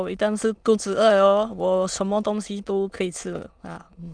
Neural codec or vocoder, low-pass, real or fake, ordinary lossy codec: autoencoder, 22.05 kHz, a latent of 192 numbers a frame, VITS, trained on many speakers; 9.9 kHz; fake; Opus, 32 kbps